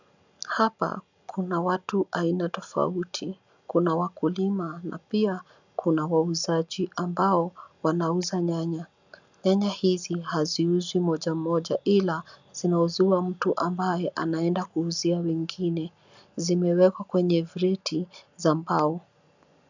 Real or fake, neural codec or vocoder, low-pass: real; none; 7.2 kHz